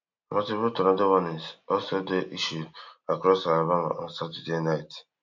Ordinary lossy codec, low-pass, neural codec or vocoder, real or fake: MP3, 48 kbps; 7.2 kHz; none; real